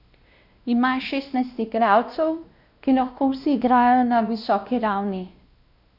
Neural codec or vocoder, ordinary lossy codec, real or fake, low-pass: codec, 16 kHz, 1 kbps, X-Codec, WavLM features, trained on Multilingual LibriSpeech; none; fake; 5.4 kHz